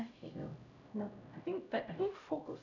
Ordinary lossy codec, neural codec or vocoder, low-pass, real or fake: none; codec, 16 kHz, 0.5 kbps, X-Codec, HuBERT features, trained on LibriSpeech; 7.2 kHz; fake